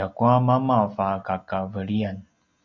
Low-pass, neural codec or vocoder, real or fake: 7.2 kHz; none; real